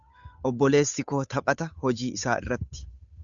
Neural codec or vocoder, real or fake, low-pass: codec, 16 kHz, 8 kbps, FunCodec, trained on Chinese and English, 25 frames a second; fake; 7.2 kHz